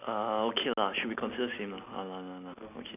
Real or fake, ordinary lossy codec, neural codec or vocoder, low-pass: real; none; none; 3.6 kHz